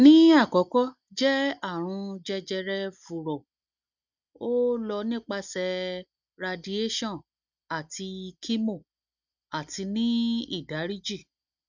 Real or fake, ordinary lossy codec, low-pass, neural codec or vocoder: real; none; 7.2 kHz; none